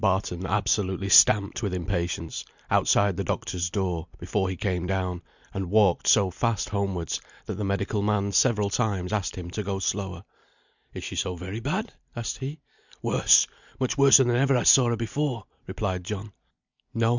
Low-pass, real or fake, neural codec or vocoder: 7.2 kHz; real; none